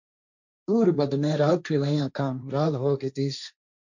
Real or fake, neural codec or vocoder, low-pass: fake; codec, 16 kHz, 1.1 kbps, Voila-Tokenizer; 7.2 kHz